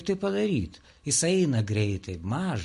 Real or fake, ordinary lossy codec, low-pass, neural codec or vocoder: real; MP3, 48 kbps; 14.4 kHz; none